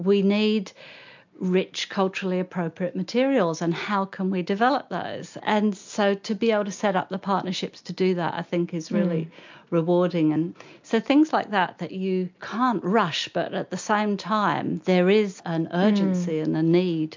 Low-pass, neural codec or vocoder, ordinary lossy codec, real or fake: 7.2 kHz; none; MP3, 64 kbps; real